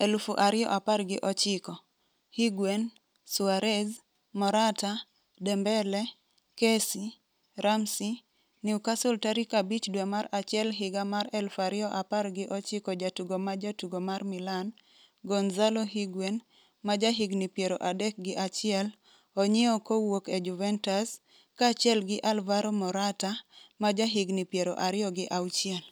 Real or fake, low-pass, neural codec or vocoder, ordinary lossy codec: real; none; none; none